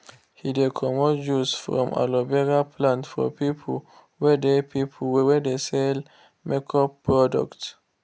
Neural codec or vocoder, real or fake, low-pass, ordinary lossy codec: none; real; none; none